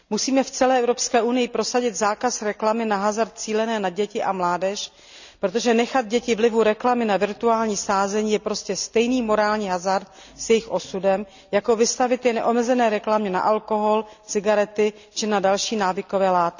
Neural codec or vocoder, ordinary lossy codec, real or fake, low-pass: none; none; real; 7.2 kHz